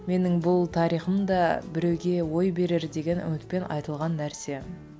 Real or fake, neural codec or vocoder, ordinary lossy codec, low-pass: real; none; none; none